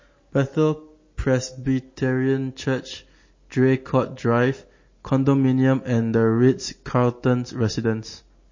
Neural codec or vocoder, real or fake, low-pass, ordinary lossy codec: none; real; 7.2 kHz; MP3, 32 kbps